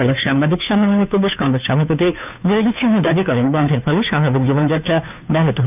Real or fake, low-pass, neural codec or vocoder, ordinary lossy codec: fake; 3.6 kHz; codec, 16 kHz, 2 kbps, FunCodec, trained on Chinese and English, 25 frames a second; none